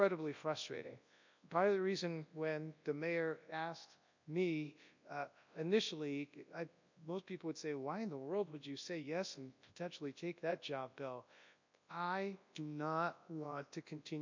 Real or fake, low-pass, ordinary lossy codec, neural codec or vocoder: fake; 7.2 kHz; MP3, 64 kbps; codec, 24 kHz, 0.9 kbps, WavTokenizer, large speech release